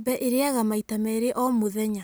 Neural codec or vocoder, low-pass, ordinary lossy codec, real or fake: none; none; none; real